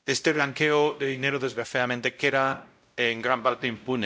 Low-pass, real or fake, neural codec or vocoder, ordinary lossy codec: none; fake; codec, 16 kHz, 0.5 kbps, X-Codec, WavLM features, trained on Multilingual LibriSpeech; none